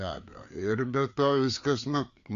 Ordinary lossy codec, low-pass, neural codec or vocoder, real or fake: Opus, 64 kbps; 7.2 kHz; codec, 16 kHz, 4 kbps, FreqCodec, larger model; fake